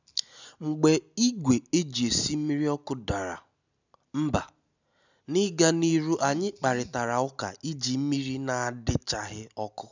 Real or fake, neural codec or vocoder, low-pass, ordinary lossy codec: real; none; 7.2 kHz; none